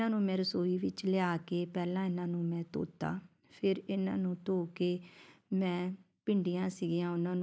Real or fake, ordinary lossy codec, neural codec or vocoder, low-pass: real; none; none; none